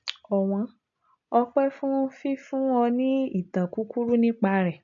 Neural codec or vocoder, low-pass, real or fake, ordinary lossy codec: none; 7.2 kHz; real; MP3, 64 kbps